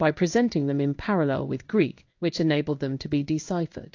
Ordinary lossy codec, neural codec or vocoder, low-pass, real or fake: AAC, 48 kbps; codec, 16 kHz in and 24 kHz out, 1 kbps, XY-Tokenizer; 7.2 kHz; fake